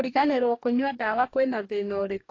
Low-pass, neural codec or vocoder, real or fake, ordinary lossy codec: 7.2 kHz; codec, 44.1 kHz, 2.6 kbps, DAC; fake; AAC, 32 kbps